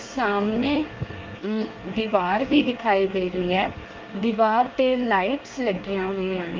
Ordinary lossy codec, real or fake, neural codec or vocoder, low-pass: Opus, 24 kbps; fake; codec, 24 kHz, 1 kbps, SNAC; 7.2 kHz